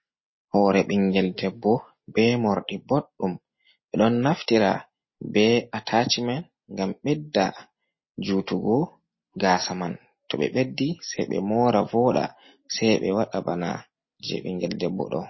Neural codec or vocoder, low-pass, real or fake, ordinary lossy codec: none; 7.2 kHz; real; MP3, 24 kbps